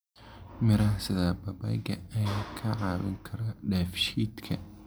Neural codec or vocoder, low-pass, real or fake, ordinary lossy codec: none; none; real; none